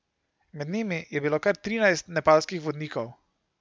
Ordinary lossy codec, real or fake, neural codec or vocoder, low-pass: none; real; none; none